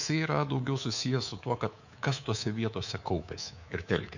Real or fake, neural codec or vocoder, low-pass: fake; codec, 24 kHz, 3.1 kbps, DualCodec; 7.2 kHz